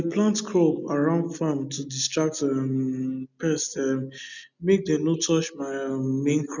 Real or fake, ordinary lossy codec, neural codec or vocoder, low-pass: real; none; none; 7.2 kHz